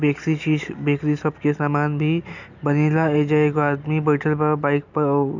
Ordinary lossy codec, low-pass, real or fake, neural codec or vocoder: none; 7.2 kHz; real; none